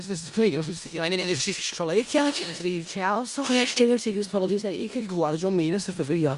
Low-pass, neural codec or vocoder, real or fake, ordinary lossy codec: 10.8 kHz; codec, 16 kHz in and 24 kHz out, 0.4 kbps, LongCat-Audio-Codec, four codebook decoder; fake; Opus, 64 kbps